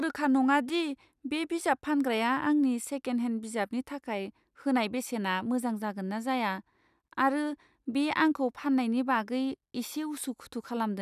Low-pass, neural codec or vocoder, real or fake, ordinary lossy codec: 14.4 kHz; none; real; none